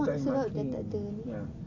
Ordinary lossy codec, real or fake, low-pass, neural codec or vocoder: AAC, 32 kbps; real; 7.2 kHz; none